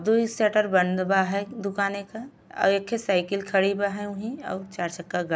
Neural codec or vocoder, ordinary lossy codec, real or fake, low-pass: none; none; real; none